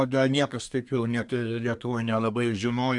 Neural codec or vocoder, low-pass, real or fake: codec, 24 kHz, 1 kbps, SNAC; 10.8 kHz; fake